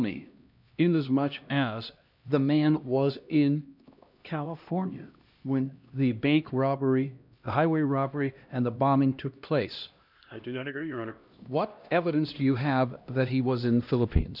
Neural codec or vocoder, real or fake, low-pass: codec, 16 kHz, 1 kbps, X-Codec, HuBERT features, trained on LibriSpeech; fake; 5.4 kHz